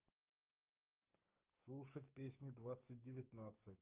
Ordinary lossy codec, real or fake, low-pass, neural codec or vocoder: Opus, 24 kbps; fake; 3.6 kHz; codec, 16 kHz, 6 kbps, DAC